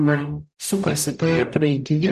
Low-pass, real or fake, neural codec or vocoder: 14.4 kHz; fake; codec, 44.1 kHz, 0.9 kbps, DAC